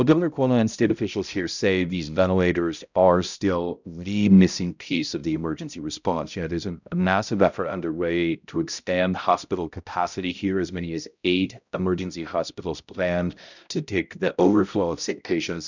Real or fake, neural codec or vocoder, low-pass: fake; codec, 16 kHz, 0.5 kbps, X-Codec, HuBERT features, trained on balanced general audio; 7.2 kHz